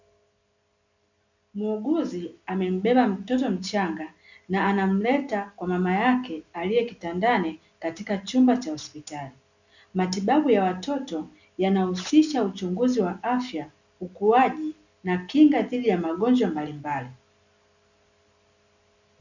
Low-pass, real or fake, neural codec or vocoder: 7.2 kHz; real; none